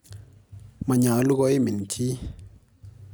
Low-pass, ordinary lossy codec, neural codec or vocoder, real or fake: none; none; none; real